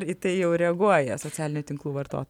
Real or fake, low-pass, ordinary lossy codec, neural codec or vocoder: real; 19.8 kHz; MP3, 96 kbps; none